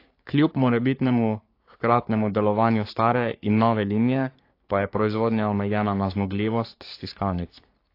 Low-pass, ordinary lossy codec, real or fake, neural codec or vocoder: 5.4 kHz; AAC, 32 kbps; fake; codec, 44.1 kHz, 3.4 kbps, Pupu-Codec